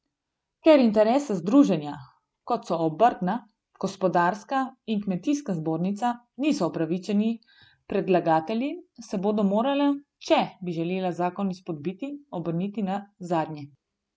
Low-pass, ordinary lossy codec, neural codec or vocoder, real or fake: none; none; none; real